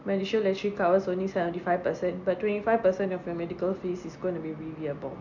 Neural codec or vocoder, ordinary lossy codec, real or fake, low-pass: none; none; real; 7.2 kHz